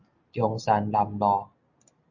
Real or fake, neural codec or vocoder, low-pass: real; none; 7.2 kHz